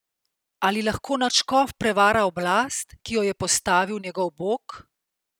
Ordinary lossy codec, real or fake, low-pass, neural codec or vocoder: none; real; none; none